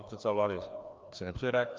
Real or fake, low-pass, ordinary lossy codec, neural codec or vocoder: fake; 7.2 kHz; Opus, 32 kbps; codec, 16 kHz, 2 kbps, FreqCodec, larger model